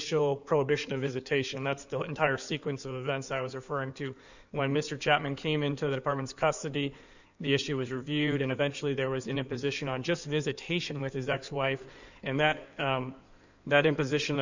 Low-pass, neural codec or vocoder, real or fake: 7.2 kHz; codec, 16 kHz in and 24 kHz out, 2.2 kbps, FireRedTTS-2 codec; fake